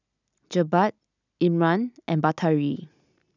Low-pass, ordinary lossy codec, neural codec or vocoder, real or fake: 7.2 kHz; none; none; real